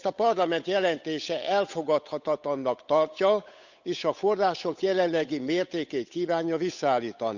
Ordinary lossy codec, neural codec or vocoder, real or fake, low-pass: none; codec, 16 kHz, 8 kbps, FunCodec, trained on Chinese and English, 25 frames a second; fake; 7.2 kHz